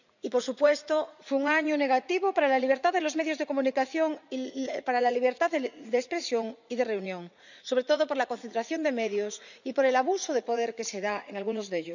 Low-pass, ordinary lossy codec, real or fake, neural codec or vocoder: 7.2 kHz; none; fake; vocoder, 44.1 kHz, 80 mel bands, Vocos